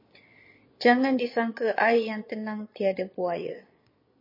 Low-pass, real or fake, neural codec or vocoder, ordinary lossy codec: 5.4 kHz; fake; vocoder, 22.05 kHz, 80 mel bands, Vocos; MP3, 24 kbps